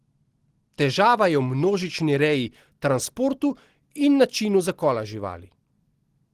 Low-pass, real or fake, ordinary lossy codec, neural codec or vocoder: 14.4 kHz; real; Opus, 16 kbps; none